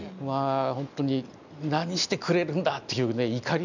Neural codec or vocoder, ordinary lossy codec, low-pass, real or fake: none; none; 7.2 kHz; real